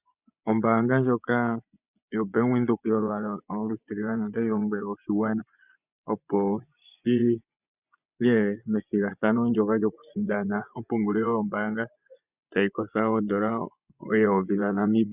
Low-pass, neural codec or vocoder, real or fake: 3.6 kHz; vocoder, 24 kHz, 100 mel bands, Vocos; fake